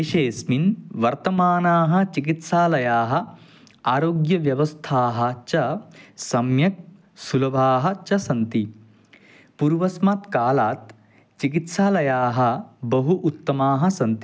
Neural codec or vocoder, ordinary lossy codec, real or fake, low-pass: none; none; real; none